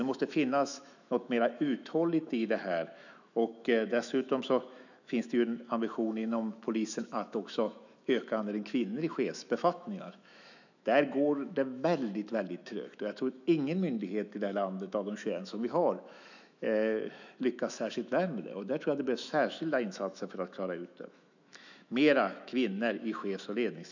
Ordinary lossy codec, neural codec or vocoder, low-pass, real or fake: none; autoencoder, 48 kHz, 128 numbers a frame, DAC-VAE, trained on Japanese speech; 7.2 kHz; fake